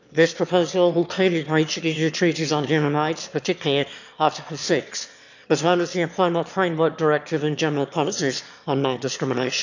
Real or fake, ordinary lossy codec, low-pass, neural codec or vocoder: fake; none; 7.2 kHz; autoencoder, 22.05 kHz, a latent of 192 numbers a frame, VITS, trained on one speaker